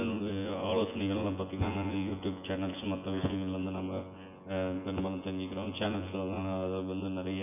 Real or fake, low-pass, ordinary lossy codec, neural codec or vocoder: fake; 3.6 kHz; none; vocoder, 24 kHz, 100 mel bands, Vocos